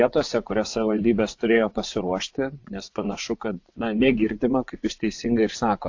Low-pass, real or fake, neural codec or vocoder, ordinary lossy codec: 7.2 kHz; fake; vocoder, 24 kHz, 100 mel bands, Vocos; AAC, 48 kbps